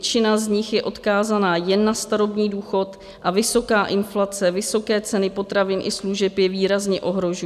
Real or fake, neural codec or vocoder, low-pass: real; none; 14.4 kHz